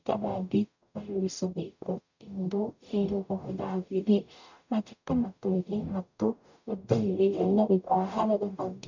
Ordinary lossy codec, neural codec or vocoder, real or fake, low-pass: none; codec, 44.1 kHz, 0.9 kbps, DAC; fake; 7.2 kHz